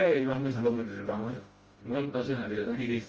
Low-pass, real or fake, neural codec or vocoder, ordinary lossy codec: 7.2 kHz; fake; codec, 16 kHz, 0.5 kbps, FreqCodec, smaller model; Opus, 24 kbps